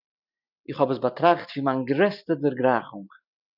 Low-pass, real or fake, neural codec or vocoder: 5.4 kHz; real; none